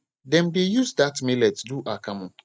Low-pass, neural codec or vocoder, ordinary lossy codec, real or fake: none; none; none; real